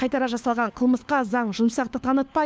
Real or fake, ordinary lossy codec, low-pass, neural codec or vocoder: fake; none; none; codec, 16 kHz, 4 kbps, FunCodec, trained on LibriTTS, 50 frames a second